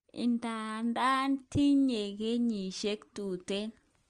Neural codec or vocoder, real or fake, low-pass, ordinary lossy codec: none; real; 9.9 kHz; Opus, 32 kbps